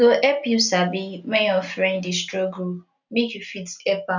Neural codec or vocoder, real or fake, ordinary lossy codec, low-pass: none; real; none; 7.2 kHz